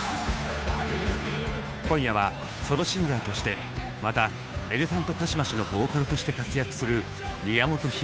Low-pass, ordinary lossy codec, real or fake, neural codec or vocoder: none; none; fake; codec, 16 kHz, 2 kbps, FunCodec, trained on Chinese and English, 25 frames a second